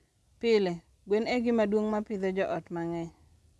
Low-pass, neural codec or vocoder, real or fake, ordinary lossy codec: none; none; real; none